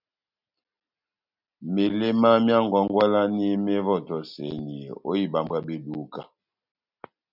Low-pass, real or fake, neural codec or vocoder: 5.4 kHz; real; none